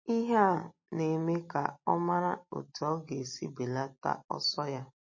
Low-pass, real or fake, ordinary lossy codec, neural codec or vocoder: 7.2 kHz; real; MP3, 32 kbps; none